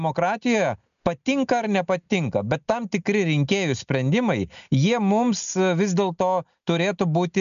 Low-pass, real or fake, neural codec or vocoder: 7.2 kHz; real; none